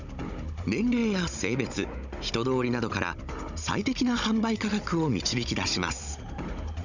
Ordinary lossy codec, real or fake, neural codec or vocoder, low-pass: none; fake; codec, 16 kHz, 16 kbps, FunCodec, trained on Chinese and English, 50 frames a second; 7.2 kHz